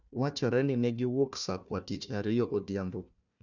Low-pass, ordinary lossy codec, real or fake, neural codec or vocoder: 7.2 kHz; none; fake; codec, 16 kHz, 1 kbps, FunCodec, trained on Chinese and English, 50 frames a second